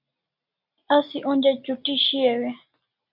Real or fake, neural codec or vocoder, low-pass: real; none; 5.4 kHz